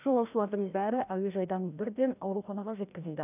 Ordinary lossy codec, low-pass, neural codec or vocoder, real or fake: none; 3.6 kHz; codec, 16 kHz, 1 kbps, FreqCodec, larger model; fake